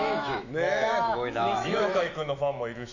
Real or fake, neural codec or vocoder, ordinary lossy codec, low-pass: fake; autoencoder, 48 kHz, 128 numbers a frame, DAC-VAE, trained on Japanese speech; none; 7.2 kHz